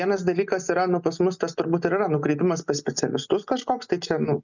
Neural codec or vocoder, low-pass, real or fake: none; 7.2 kHz; real